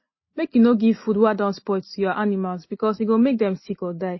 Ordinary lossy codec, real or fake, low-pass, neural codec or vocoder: MP3, 24 kbps; real; 7.2 kHz; none